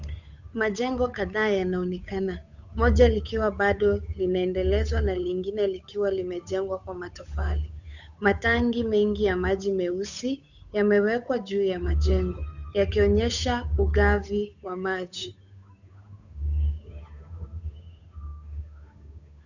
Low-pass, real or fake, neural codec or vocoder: 7.2 kHz; fake; codec, 16 kHz, 8 kbps, FunCodec, trained on Chinese and English, 25 frames a second